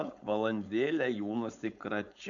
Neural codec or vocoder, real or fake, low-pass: codec, 16 kHz, 4.8 kbps, FACodec; fake; 7.2 kHz